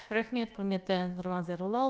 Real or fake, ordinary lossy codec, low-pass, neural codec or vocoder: fake; none; none; codec, 16 kHz, about 1 kbps, DyCAST, with the encoder's durations